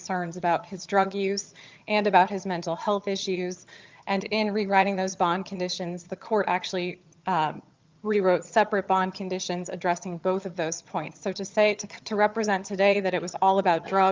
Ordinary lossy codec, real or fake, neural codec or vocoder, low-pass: Opus, 32 kbps; fake; vocoder, 22.05 kHz, 80 mel bands, HiFi-GAN; 7.2 kHz